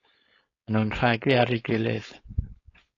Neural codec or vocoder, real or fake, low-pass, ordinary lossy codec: codec, 16 kHz, 4.8 kbps, FACodec; fake; 7.2 kHz; AAC, 32 kbps